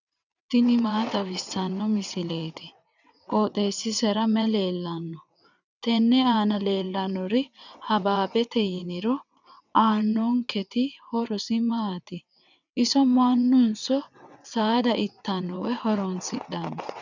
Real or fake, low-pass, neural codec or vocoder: fake; 7.2 kHz; vocoder, 22.05 kHz, 80 mel bands, WaveNeXt